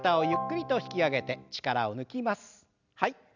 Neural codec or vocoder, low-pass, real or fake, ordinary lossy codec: none; 7.2 kHz; real; none